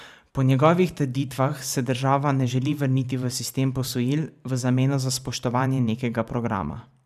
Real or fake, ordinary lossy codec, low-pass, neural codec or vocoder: fake; none; 14.4 kHz; vocoder, 44.1 kHz, 128 mel bands every 512 samples, BigVGAN v2